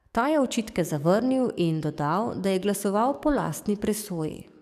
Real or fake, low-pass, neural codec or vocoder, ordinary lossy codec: fake; 14.4 kHz; codec, 44.1 kHz, 7.8 kbps, DAC; none